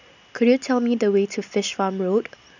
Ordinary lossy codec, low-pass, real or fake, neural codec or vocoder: none; 7.2 kHz; real; none